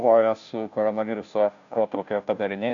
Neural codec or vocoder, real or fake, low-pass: codec, 16 kHz, 0.5 kbps, FunCodec, trained on Chinese and English, 25 frames a second; fake; 7.2 kHz